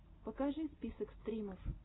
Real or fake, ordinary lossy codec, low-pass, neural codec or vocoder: real; AAC, 16 kbps; 7.2 kHz; none